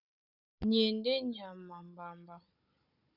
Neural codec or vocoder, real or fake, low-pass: codec, 16 kHz, 16 kbps, FunCodec, trained on Chinese and English, 50 frames a second; fake; 5.4 kHz